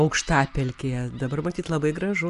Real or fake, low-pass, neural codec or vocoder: real; 10.8 kHz; none